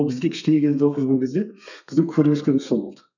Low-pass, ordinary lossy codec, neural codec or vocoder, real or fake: 7.2 kHz; none; codec, 32 kHz, 1.9 kbps, SNAC; fake